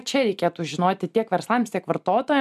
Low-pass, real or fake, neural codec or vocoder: 14.4 kHz; real; none